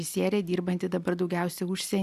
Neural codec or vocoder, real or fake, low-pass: none; real; 14.4 kHz